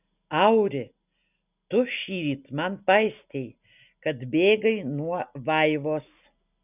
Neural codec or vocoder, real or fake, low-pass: none; real; 3.6 kHz